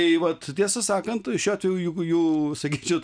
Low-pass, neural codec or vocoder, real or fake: 9.9 kHz; none; real